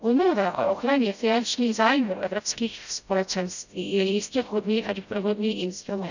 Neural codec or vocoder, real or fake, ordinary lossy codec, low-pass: codec, 16 kHz, 0.5 kbps, FreqCodec, smaller model; fake; none; 7.2 kHz